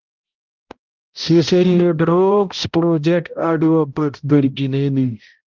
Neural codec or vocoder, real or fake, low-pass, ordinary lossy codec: codec, 16 kHz, 0.5 kbps, X-Codec, HuBERT features, trained on balanced general audio; fake; 7.2 kHz; Opus, 32 kbps